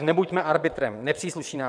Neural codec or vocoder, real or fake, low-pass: vocoder, 22.05 kHz, 80 mel bands, WaveNeXt; fake; 9.9 kHz